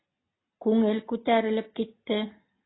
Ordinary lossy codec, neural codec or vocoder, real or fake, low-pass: AAC, 16 kbps; none; real; 7.2 kHz